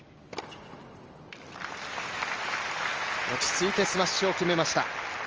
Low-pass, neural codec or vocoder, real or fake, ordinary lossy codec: 7.2 kHz; none; real; Opus, 24 kbps